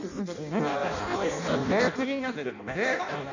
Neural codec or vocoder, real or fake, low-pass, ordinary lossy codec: codec, 16 kHz in and 24 kHz out, 0.6 kbps, FireRedTTS-2 codec; fake; 7.2 kHz; none